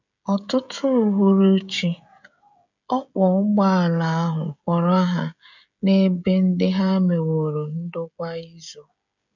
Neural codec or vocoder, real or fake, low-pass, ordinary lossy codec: codec, 16 kHz, 16 kbps, FreqCodec, smaller model; fake; 7.2 kHz; none